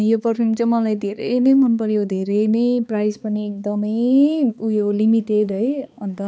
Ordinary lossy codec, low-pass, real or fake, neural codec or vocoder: none; none; fake; codec, 16 kHz, 4 kbps, X-Codec, HuBERT features, trained on balanced general audio